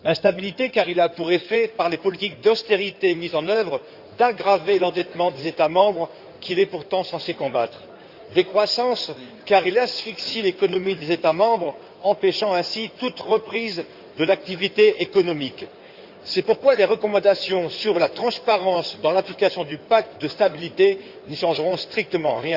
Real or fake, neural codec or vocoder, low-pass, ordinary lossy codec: fake; codec, 16 kHz in and 24 kHz out, 2.2 kbps, FireRedTTS-2 codec; 5.4 kHz; Opus, 64 kbps